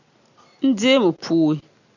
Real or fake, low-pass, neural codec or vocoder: real; 7.2 kHz; none